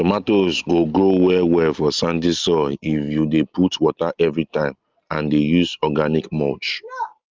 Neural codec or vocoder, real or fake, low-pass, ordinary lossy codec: none; real; 7.2 kHz; Opus, 24 kbps